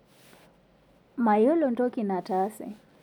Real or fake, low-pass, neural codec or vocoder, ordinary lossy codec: real; 19.8 kHz; none; none